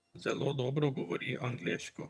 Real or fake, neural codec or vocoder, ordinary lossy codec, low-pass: fake; vocoder, 22.05 kHz, 80 mel bands, HiFi-GAN; none; none